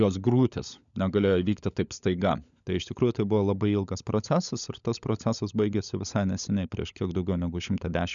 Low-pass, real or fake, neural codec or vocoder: 7.2 kHz; fake; codec, 16 kHz, 16 kbps, FunCodec, trained on LibriTTS, 50 frames a second